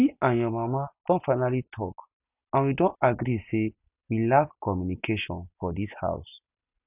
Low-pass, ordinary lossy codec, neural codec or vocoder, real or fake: 3.6 kHz; none; none; real